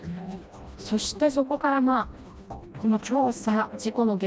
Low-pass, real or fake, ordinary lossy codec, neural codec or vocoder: none; fake; none; codec, 16 kHz, 1 kbps, FreqCodec, smaller model